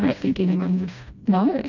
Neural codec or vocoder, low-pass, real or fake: codec, 16 kHz, 1 kbps, FreqCodec, smaller model; 7.2 kHz; fake